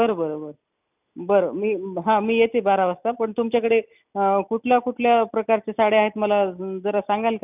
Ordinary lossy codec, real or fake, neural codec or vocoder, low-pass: none; real; none; 3.6 kHz